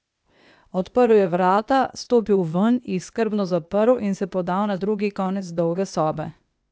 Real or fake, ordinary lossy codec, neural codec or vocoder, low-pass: fake; none; codec, 16 kHz, 0.8 kbps, ZipCodec; none